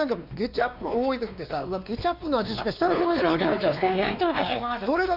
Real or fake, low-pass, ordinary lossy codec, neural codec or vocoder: fake; 5.4 kHz; none; codec, 16 kHz, 2 kbps, X-Codec, WavLM features, trained on Multilingual LibriSpeech